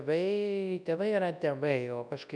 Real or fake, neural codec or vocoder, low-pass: fake; codec, 24 kHz, 0.9 kbps, WavTokenizer, large speech release; 9.9 kHz